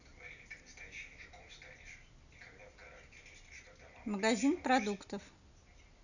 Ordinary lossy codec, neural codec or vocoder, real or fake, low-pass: none; none; real; 7.2 kHz